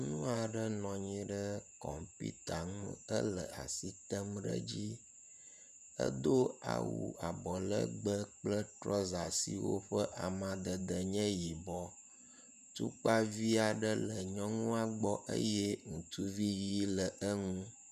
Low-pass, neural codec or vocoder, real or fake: 14.4 kHz; vocoder, 48 kHz, 128 mel bands, Vocos; fake